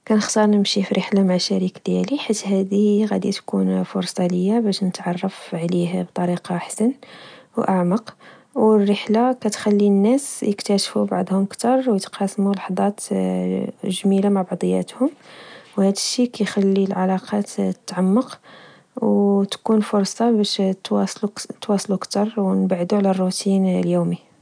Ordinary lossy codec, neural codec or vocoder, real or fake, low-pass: none; none; real; 9.9 kHz